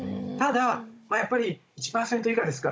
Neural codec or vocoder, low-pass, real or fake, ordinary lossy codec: codec, 16 kHz, 16 kbps, FunCodec, trained on Chinese and English, 50 frames a second; none; fake; none